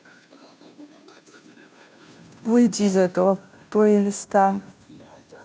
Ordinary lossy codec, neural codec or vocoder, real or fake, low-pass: none; codec, 16 kHz, 0.5 kbps, FunCodec, trained on Chinese and English, 25 frames a second; fake; none